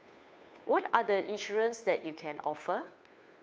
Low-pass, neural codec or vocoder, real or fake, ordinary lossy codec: none; codec, 16 kHz, 2 kbps, FunCodec, trained on Chinese and English, 25 frames a second; fake; none